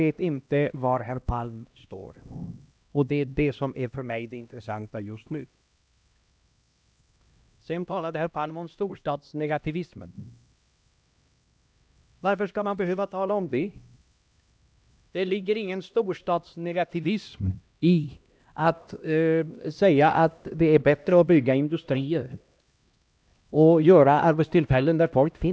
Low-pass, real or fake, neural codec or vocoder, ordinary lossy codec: none; fake; codec, 16 kHz, 1 kbps, X-Codec, HuBERT features, trained on LibriSpeech; none